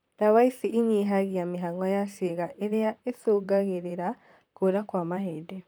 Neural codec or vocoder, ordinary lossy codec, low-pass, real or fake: vocoder, 44.1 kHz, 128 mel bands, Pupu-Vocoder; none; none; fake